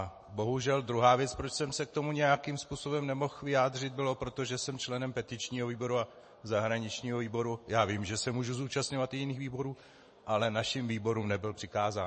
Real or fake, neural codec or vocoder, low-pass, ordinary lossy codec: real; none; 10.8 kHz; MP3, 32 kbps